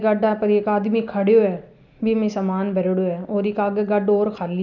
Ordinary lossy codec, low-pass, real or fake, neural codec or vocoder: none; 7.2 kHz; real; none